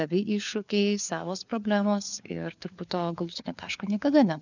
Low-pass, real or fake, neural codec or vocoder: 7.2 kHz; fake; codec, 16 kHz, 4 kbps, FunCodec, trained on LibriTTS, 50 frames a second